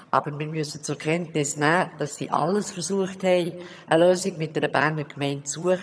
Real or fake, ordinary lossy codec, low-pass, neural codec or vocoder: fake; none; none; vocoder, 22.05 kHz, 80 mel bands, HiFi-GAN